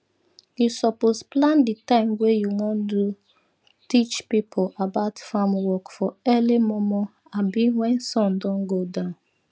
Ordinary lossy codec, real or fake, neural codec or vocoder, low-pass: none; real; none; none